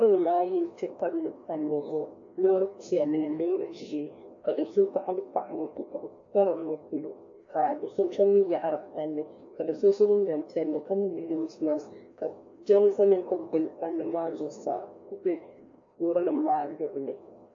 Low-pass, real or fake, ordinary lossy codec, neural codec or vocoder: 7.2 kHz; fake; AAC, 64 kbps; codec, 16 kHz, 1 kbps, FreqCodec, larger model